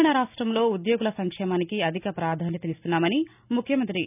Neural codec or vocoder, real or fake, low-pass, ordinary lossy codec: none; real; 3.6 kHz; none